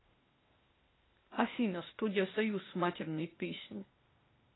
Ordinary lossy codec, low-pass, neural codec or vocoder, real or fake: AAC, 16 kbps; 7.2 kHz; codec, 16 kHz, 0.8 kbps, ZipCodec; fake